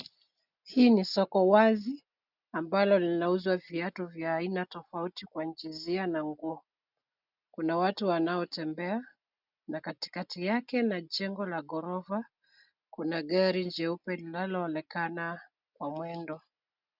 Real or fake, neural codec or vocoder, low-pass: real; none; 5.4 kHz